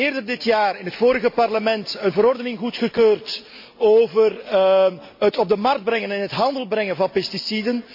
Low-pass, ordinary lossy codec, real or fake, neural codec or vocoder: 5.4 kHz; MP3, 32 kbps; real; none